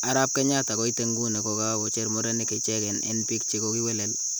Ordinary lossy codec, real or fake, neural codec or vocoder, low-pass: none; real; none; none